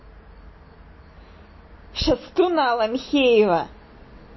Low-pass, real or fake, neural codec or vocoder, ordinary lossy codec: 7.2 kHz; real; none; MP3, 24 kbps